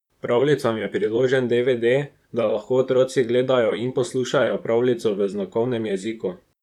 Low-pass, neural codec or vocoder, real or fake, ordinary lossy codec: 19.8 kHz; vocoder, 44.1 kHz, 128 mel bands, Pupu-Vocoder; fake; none